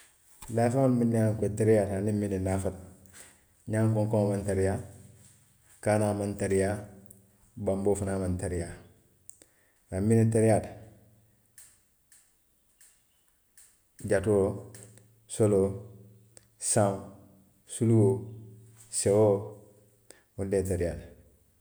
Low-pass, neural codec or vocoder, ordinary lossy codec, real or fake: none; none; none; real